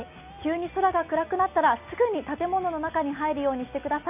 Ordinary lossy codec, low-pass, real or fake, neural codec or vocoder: none; 3.6 kHz; real; none